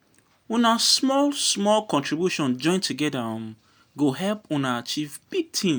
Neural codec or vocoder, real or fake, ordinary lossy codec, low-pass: none; real; none; none